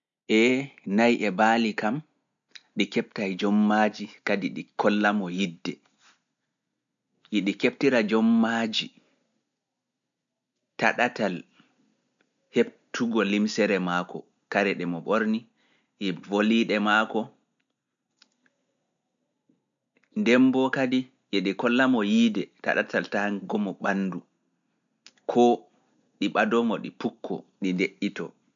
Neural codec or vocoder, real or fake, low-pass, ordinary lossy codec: none; real; 7.2 kHz; none